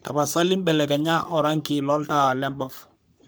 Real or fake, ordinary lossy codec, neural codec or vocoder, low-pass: fake; none; codec, 44.1 kHz, 3.4 kbps, Pupu-Codec; none